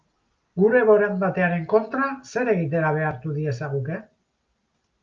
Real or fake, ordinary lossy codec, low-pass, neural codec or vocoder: real; Opus, 24 kbps; 7.2 kHz; none